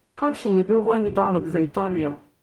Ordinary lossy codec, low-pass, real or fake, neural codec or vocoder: Opus, 32 kbps; 19.8 kHz; fake; codec, 44.1 kHz, 0.9 kbps, DAC